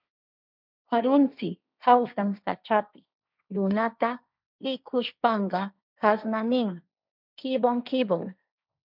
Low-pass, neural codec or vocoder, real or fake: 5.4 kHz; codec, 16 kHz, 1.1 kbps, Voila-Tokenizer; fake